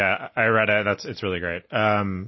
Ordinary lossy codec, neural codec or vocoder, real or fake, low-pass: MP3, 24 kbps; none; real; 7.2 kHz